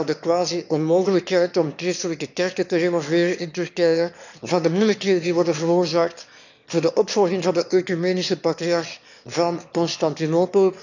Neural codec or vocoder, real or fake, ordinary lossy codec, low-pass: autoencoder, 22.05 kHz, a latent of 192 numbers a frame, VITS, trained on one speaker; fake; none; 7.2 kHz